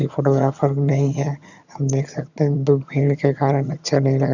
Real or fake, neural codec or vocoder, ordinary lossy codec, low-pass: fake; vocoder, 22.05 kHz, 80 mel bands, HiFi-GAN; none; 7.2 kHz